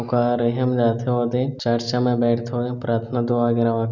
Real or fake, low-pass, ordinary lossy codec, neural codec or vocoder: real; 7.2 kHz; none; none